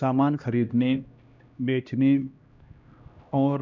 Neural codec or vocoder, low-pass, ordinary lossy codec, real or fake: codec, 16 kHz, 1 kbps, X-Codec, HuBERT features, trained on LibriSpeech; 7.2 kHz; none; fake